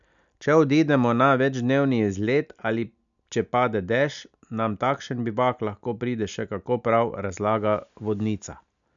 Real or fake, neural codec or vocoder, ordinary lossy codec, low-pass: real; none; none; 7.2 kHz